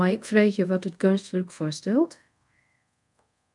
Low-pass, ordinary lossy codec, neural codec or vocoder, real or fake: 10.8 kHz; MP3, 96 kbps; codec, 24 kHz, 0.5 kbps, DualCodec; fake